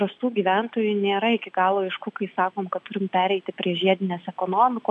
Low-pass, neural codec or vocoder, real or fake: 10.8 kHz; none; real